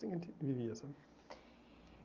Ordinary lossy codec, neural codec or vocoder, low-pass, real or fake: Opus, 24 kbps; none; 7.2 kHz; real